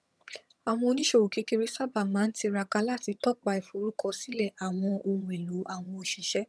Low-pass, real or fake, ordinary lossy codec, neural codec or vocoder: none; fake; none; vocoder, 22.05 kHz, 80 mel bands, HiFi-GAN